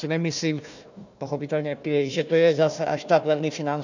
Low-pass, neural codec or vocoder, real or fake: 7.2 kHz; codec, 16 kHz, 1 kbps, FunCodec, trained on Chinese and English, 50 frames a second; fake